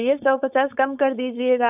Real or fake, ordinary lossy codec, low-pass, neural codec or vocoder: fake; none; 3.6 kHz; codec, 16 kHz, 4.8 kbps, FACodec